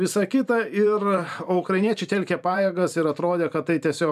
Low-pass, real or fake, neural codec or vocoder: 14.4 kHz; fake; vocoder, 44.1 kHz, 128 mel bands every 512 samples, BigVGAN v2